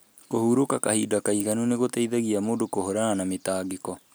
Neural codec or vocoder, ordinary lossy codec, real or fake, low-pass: none; none; real; none